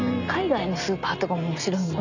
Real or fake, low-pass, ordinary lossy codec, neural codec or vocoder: real; 7.2 kHz; none; none